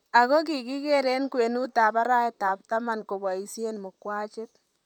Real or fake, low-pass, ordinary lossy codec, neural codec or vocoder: fake; 19.8 kHz; none; vocoder, 44.1 kHz, 128 mel bands, Pupu-Vocoder